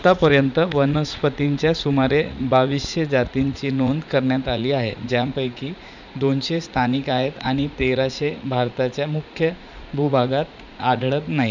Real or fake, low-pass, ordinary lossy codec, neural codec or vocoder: fake; 7.2 kHz; none; vocoder, 44.1 kHz, 80 mel bands, Vocos